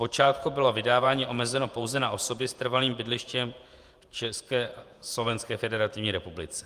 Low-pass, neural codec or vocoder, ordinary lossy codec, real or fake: 14.4 kHz; vocoder, 48 kHz, 128 mel bands, Vocos; Opus, 32 kbps; fake